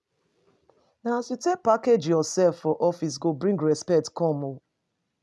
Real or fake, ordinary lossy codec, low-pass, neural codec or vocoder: real; none; none; none